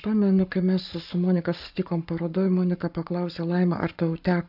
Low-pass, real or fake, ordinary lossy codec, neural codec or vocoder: 5.4 kHz; fake; AAC, 48 kbps; codec, 44.1 kHz, 7.8 kbps, Pupu-Codec